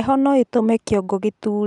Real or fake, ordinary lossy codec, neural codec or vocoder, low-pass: real; none; none; 10.8 kHz